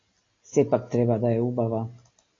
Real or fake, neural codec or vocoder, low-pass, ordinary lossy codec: real; none; 7.2 kHz; AAC, 32 kbps